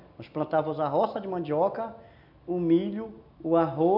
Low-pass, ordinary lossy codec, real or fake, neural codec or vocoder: 5.4 kHz; none; real; none